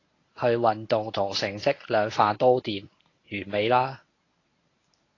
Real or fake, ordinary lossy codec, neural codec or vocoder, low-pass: fake; AAC, 32 kbps; codec, 24 kHz, 0.9 kbps, WavTokenizer, medium speech release version 1; 7.2 kHz